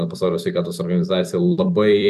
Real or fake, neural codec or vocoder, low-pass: fake; vocoder, 44.1 kHz, 128 mel bands every 256 samples, BigVGAN v2; 14.4 kHz